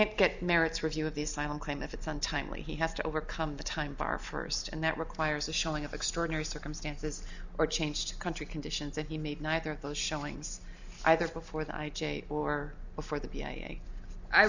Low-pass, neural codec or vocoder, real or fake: 7.2 kHz; none; real